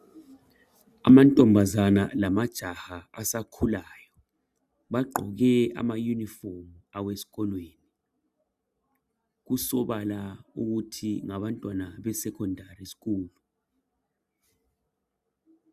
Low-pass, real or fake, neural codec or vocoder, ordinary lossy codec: 14.4 kHz; real; none; Opus, 64 kbps